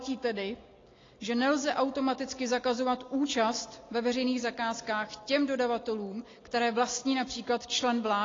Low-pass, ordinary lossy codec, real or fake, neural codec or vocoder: 7.2 kHz; AAC, 32 kbps; real; none